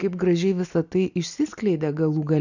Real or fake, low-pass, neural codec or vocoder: real; 7.2 kHz; none